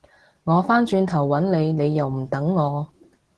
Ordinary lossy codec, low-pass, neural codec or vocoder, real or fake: Opus, 16 kbps; 10.8 kHz; none; real